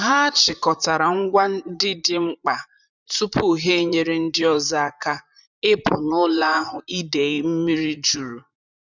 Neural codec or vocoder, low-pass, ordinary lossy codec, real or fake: vocoder, 44.1 kHz, 128 mel bands, Pupu-Vocoder; 7.2 kHz; none; fake